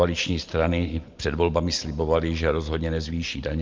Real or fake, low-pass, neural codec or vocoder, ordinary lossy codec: real; 7.2 kHz; none; Opus, 24 kbps